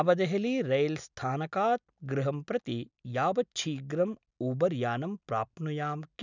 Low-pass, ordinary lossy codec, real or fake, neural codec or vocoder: 7.2 kHz; none; real; none